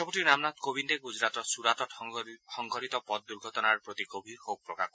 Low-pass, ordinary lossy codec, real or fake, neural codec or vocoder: none; none; real; none